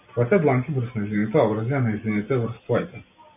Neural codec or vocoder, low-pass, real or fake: none; 3.6 kHz; real